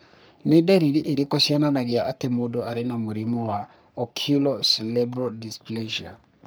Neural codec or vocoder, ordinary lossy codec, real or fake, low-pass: codec, 44.1 kHz, 3.4 kbps, Pupu-Codec; none; fake; none